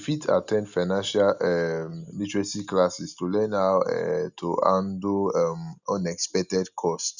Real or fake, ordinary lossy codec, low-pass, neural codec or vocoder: real; none; 7.2 kHz; none